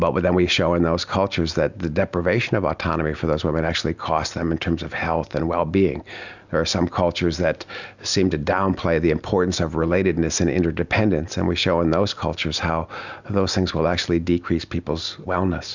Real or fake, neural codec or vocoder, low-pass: real; none; 7.2 kHz